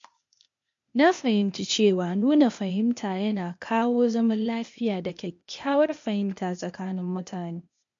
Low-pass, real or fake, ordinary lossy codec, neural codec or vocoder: 7.2 kHz; fake; MP3, 48 kbps; codec, 16 kHz, 0.8 kbps, ZipCodec